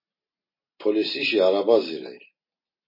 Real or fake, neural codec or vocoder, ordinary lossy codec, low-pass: real; none; MP3, 24 kbps; 5.4 kHz